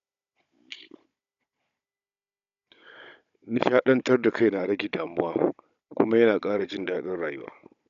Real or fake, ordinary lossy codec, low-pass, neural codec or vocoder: fake; none; 7.2 kHz; codec, 16 kHz, 16 kbps, FunCodec, trained on Chinese and English, 50 frames a second